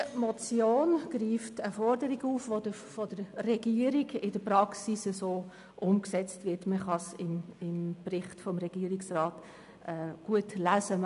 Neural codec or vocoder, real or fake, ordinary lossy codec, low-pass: none; real; none; 10.8 kHz